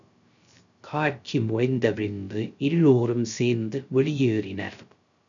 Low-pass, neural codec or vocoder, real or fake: 7.2 kHz; codec, 16 kHz, 0.3 kbps, FocalCodec; fake